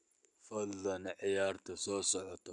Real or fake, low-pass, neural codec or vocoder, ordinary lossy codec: real; 10.8 kHz; none; none